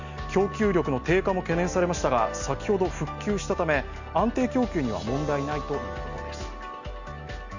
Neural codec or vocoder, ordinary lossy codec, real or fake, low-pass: none; none; real; 7.2 kHz